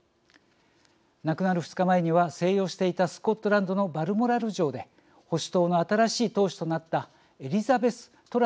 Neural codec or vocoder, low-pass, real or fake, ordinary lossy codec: none; none; real; none